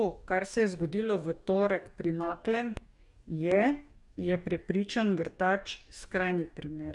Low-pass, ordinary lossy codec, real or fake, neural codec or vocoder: 10.8 kHz; none; fake; codec, 44.1 kHz, 2.6 kbps, DAC